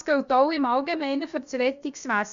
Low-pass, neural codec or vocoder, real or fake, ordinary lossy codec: 7.2 kHz; codec, 16 kHz, about 1 kbps, DyCAST, with the encoder's durations; fake; Opus, 64 kbps